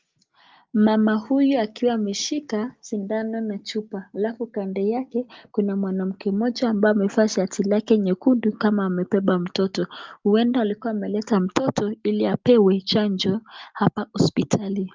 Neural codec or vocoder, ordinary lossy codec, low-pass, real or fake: none; Opus, 32 kbps; 7.2 kHz; real